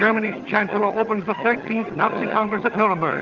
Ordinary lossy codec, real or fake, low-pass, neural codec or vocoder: Opus, 24 kbps; fake; 7.2 kHz; vocoder, 22.05 kHz, 80 mel bands, HiFi-GAN